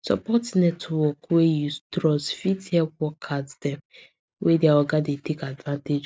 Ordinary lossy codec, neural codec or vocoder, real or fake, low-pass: none; none; real; none